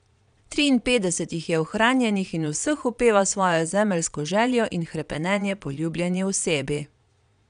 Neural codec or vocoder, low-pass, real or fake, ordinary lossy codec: vocoder, 22.05 kHz, 80 mel bands, Vocos; 9.9 kHz; fake; none